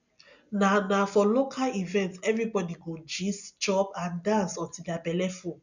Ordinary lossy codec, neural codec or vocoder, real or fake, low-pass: none; none; real; 7.2 kHz